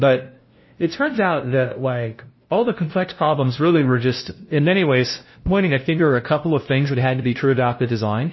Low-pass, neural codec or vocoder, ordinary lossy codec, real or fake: 7.2 kHz; codec, 16 kHz, 0.5 kbps, FunCodec, trained on LibriTTS, 25 frames a second; MP3, 24 kbps; fake